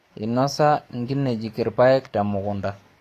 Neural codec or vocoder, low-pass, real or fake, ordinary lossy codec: codec, 44.1 kHz, 7.8 kbps, DAC; 14.4 kHz; fake; AAC, 48 kbps